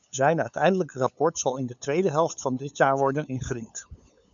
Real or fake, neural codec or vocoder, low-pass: fake; codec, 16 kHz, 8 kbps, FunCodec, trained on LibriTTS, 25 frames a second; 7.2 kHz